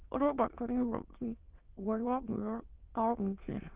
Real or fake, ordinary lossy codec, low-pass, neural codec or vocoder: fake; Opus, 16 kbps; 3.6 kHz; autoencoder, 22.05 kHz, a latent of 192 numbers a frame, VITS, trained on many speakers